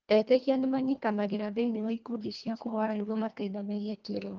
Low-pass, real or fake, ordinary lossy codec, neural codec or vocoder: 7.2 kHz; fake; Opus, 24 kbps; codec, 24 kHz, 1.5 kbps, HILCodec